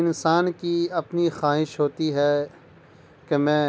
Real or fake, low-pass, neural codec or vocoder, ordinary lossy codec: real; none; none; none